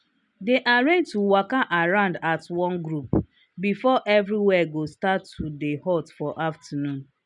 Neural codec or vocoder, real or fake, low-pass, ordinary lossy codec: none; real; 10.8 kHz; none